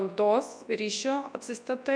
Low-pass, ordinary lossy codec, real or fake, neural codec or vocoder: 9.9 kHz; MP3, 64 kbps; fake; codec, 24 kHz, 0.9 kbps, WavTokenizer, large speech release